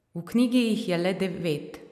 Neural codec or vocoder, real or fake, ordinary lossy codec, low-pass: none; real; none; 14.4 kHz